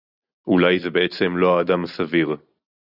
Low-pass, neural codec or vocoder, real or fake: 5.4 kHz; none; real